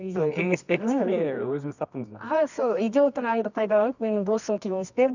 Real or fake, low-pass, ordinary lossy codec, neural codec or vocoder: fake; 7.2 kHz; none; codec, 24 kHz, 0.9 kbps, WavTokenizer, medium music audio release